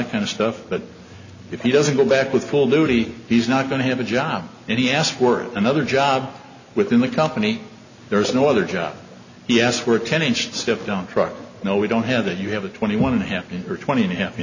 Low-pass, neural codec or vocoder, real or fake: 7.2 kHz; none; real